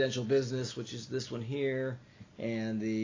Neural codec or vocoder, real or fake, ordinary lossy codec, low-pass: none; real; AAC, 32 kbps; 7.2 kHz